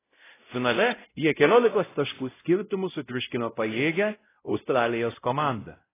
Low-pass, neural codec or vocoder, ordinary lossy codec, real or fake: 3.6 kHz; codec, 16 kHz, 0.5 kbps, X-Codec, WavLM features, trained on Multilingual LibriSpeech; AAC, 16 kbps; fake